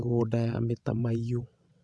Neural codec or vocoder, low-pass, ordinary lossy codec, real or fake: vocoder, 22.05 kHz, 80 mel bands, WaveNeXt; none; none; fake